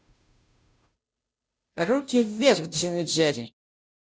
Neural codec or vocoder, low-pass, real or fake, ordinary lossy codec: codec, 16 kHz, 0.5 kbps, FunCodec, trained on Chinese and English, 25 frames a second; none; fake; none